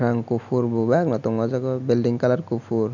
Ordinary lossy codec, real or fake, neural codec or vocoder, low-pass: none; real; none; 7.2 kHz